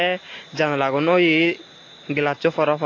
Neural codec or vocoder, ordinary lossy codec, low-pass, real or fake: none; AAC, 32 kbps; 7.2 kHz; real